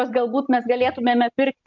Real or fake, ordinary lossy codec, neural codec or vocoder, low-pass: real; MP3, 64 kbps; none; 7.2 kHz